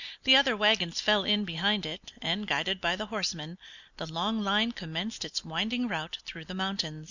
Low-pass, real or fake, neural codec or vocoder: 7.2 kHz; real; none